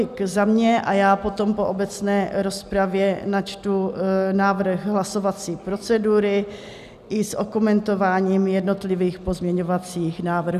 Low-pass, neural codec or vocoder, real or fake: 14.4 kHz; none; real